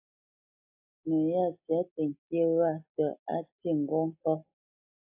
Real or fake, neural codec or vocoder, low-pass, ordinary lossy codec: real; none; 3.6 kHz; AAC, 32 kbps